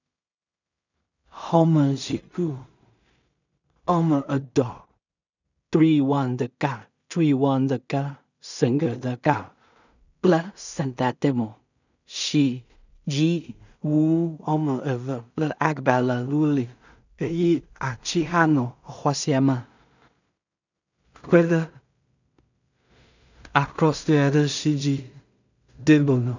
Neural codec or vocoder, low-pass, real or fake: codec, 16 kHz in and 24 kHz out, 0.4 kbps, LongCat-Audio-Codec, two codebook decoder; 7.2 kHz; fake